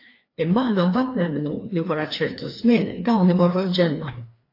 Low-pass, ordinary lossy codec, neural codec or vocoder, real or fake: 5.4 kHz; AAC, 32 kbps; codec, 16 kHz, 2 kbps, FreqCodec, larger model; fake